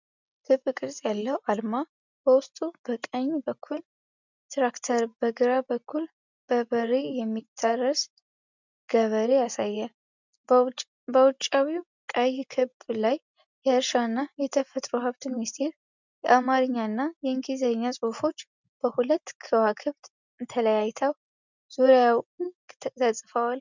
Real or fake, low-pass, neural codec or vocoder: real; 7.2 kHz; none